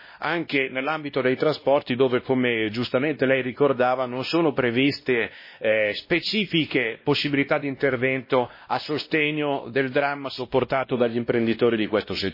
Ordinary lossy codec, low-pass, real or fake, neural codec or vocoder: MP3, 24 kbps; 5.4 kHz; fake; codec, 16 kHz, 1 kbps, X-Codec, WavLM features, trained on Multilingual LibriSpeech